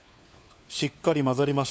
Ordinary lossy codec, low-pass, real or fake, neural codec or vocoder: none; none; fake; codec, 16 kHz, 4 kbps, FunCodec, trained on LibriTTS, 50 frames a second